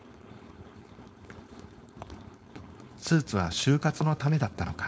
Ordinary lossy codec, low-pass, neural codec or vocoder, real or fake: none; none; codec, 16 kHz, 4.8 kbps, FACodec; fake